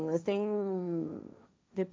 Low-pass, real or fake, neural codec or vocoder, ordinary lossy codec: none; fake; codec, 16 kHz, 1.1 kbps, Voila-Tokenizer; none